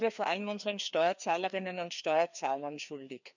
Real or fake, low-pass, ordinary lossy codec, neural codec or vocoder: fake; 7.2 kHz; none; codec, 16 kHz, 2 kbps, FreqCodec, larger model